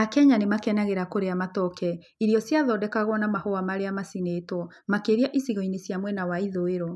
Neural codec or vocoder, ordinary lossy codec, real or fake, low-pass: none; none; real; none